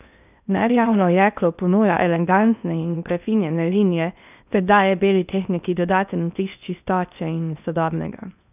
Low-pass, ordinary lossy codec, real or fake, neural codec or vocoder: 3.6 kHz; none; fake; codec, 16 kHz in and 24 kHz out, 0.8 kbps, FocalCodec, streaming, 65536 codes